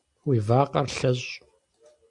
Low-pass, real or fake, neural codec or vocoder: 10.8 kHz; real; none